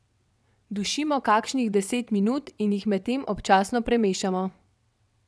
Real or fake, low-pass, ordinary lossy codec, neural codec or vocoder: fake; none; none; vocoder, 22.05 kHz, 80 mel bands, WaveNeXt